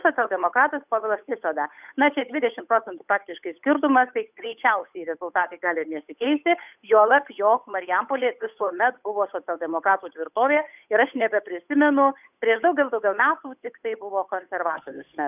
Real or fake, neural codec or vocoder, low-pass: fake; codec, 16 kHz, 8 kbps, FunCodec, trained on Chinese and English, 25 frames a second; 3.6 kHz